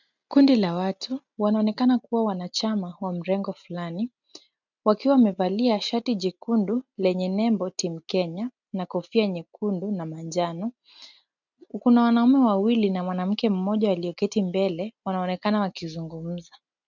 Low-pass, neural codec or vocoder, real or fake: 7.2 kHz; none; real